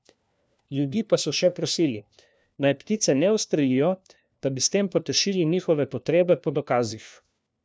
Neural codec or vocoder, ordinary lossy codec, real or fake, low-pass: codec, 16 kHz, 1 kbps, FunCodec, trained on LibriTTS, 50 frames a second; none; fake; none